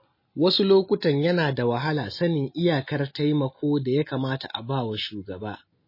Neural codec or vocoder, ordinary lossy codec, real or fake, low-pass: none; MP3, 24 kbps; real; 5.4 kHz